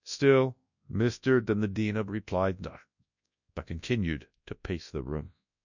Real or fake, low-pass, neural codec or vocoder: fake; 7.2 kHz; codec, 24 kHz, 0.9 kbps, WavTokenizer, large speech release